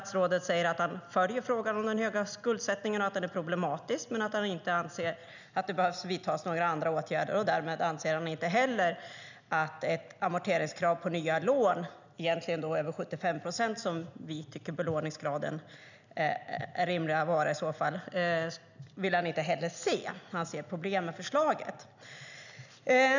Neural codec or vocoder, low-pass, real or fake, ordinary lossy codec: none; 7.2 kHz; real; none